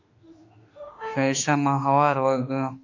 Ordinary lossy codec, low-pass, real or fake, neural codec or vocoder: AAC, 48 kbps; 7.2 kHz; fake; autoencoder, 48 kHz, 32 numbers a frame, DAC-VAE, trained on Japanese speech